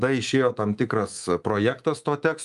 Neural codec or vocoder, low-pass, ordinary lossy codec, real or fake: codec, 24 kHz, 3.1 kbps, DualCodec; 10.8 kHz; Opus, 32 kbps; fake